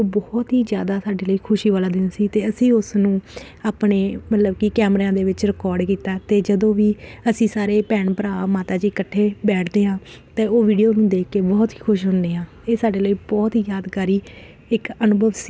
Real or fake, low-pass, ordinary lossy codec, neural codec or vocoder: real; none; none; none